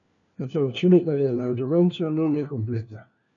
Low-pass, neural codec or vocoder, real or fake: 7.2 kHz; codec, 16 kHz, 1 kbps, FunCodec, trained on LibriTTS, 50 frames a second; fake